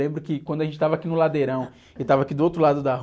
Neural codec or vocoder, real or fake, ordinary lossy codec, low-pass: none; real; none; none